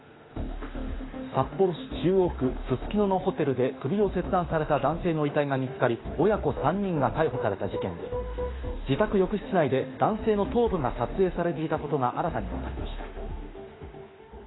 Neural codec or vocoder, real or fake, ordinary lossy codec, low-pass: autoencoder, 48 kHz, 32 numbers a frame, DAC-VAE, trained on Japanese speech; fake; AAC, 16 kbps; 7.2 kHz